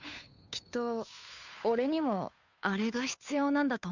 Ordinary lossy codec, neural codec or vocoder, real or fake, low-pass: none; codec, 16 kHz, 2 kbps, FunCodec, trained on Chinese and English, 25 frames a second; fake; 7.2 kHz